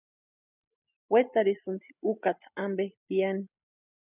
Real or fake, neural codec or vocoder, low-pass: real; none; 3.6 kHz